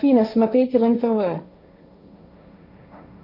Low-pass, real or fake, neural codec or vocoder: 5.4 kHz; fake; codec, 16 kHz, 1.1 kbps, Voila-Tokenizer